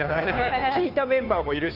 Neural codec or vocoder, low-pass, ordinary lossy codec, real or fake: codec, 16 kHz, 2 kbps, FunCodec, trained on Chinese and English, 25 frames a second; 5.4 kHz; none; fake